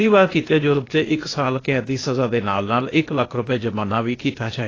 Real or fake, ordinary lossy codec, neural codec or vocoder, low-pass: fake; AAC, 32 kbps; codec, 16 kHz, 0.8 kbps, ZipCodec; 7.2 kHz